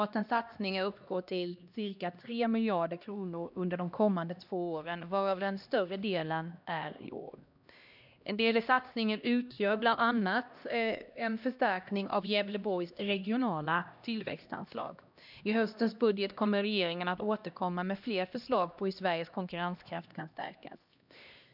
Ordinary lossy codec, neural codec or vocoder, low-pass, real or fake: none; codec, 16 kHz, 1 kbps, X-Codec, HuBERT features, trained on LibriSpeech; 5.4 kHz; fake